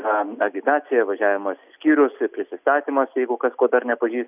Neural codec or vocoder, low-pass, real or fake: none; 3.6 kHz; real